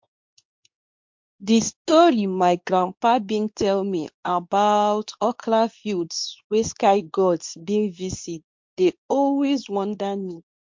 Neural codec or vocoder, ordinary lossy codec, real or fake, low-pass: codec, 24 kHz, 0.9 kbps, WavTokenizer, medium speech release version 2; MP3, 48 kbps; fake; 7.2 kHz